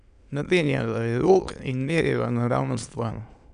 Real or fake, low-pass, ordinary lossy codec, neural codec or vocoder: fake; 9.9 kHz; none; autoencoder, 22.05 kHz, a latent of 192 numbers a frame, VITS, trained on many speakers